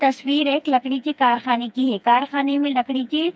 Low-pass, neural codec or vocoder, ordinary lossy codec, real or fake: none; codec, 16 kHz, 2 kbps, FreqCodec, smaller model; none; fake